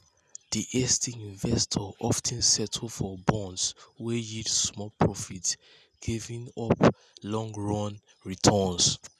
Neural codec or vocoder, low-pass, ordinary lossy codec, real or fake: none; 14.4 kHz; none; real